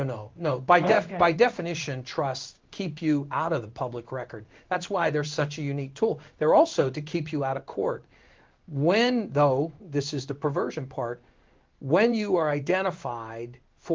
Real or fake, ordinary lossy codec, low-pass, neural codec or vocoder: fake; Opus, 32 kbps; 7.2 kHz; codec, 16 kHz in and 24 kHz out, 1 kbps, XY-Tokenizer